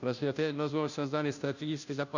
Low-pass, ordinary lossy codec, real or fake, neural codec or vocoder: 7.2 kHz; none; fake; codec, 16 kHz, 0.5 kbps, FunCodec, trained on Chinese and English, 25 frames a second